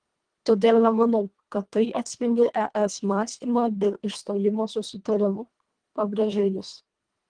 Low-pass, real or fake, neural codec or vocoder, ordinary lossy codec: 9.9 kHz; fake; codec, 24 kHz, 1.5 kbps, HILCodec; Opus, 24 kbps